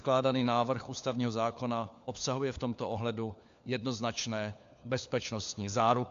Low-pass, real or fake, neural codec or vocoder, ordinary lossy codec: 7.2 kHz; fake; codec, 16 kHz, 4 kbps, FunCodec, trained on LibriTTS, 50 frames a second; AAC, 64 kbps